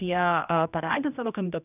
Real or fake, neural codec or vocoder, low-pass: fake; codec, 16 kHz, 1 kbps, X-Codec, HuBERT features, trained on general audio; 3.6 kHz